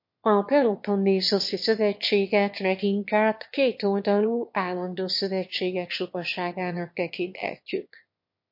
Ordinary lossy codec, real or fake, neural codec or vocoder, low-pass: MP3, 32 kbps; fake; autoencoder, 22.05 kHz, a latent of 192 numbers a frame, VITS, trained on one speaker; 5.4 kHz